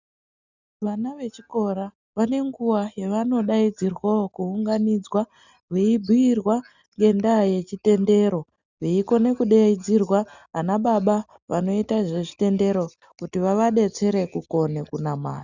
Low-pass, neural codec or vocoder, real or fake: 7.2 kHz; none; real